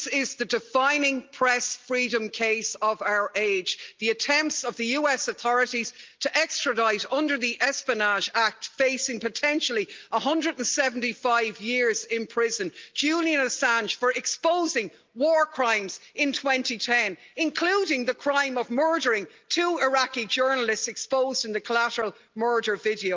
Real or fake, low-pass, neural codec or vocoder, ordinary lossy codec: real; 7.2 kHz; none; Opus, 32 kbps